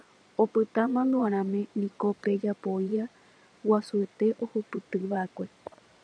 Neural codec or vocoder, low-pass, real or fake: vocoder, 22.05 kHz, 80 mel bands, Vocos; 9.9 kHz; fake